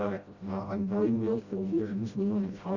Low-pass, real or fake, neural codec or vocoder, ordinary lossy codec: 7.2 kHz; fake; codec, 16 kHz, 0.5 kbps, FreqCodec, smaller model; Opus, 64 kbps